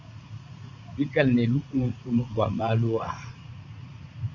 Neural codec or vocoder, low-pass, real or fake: vocoder, 44.1 kHz, 80 mel bands, Vocos; 7.2 kHz; fake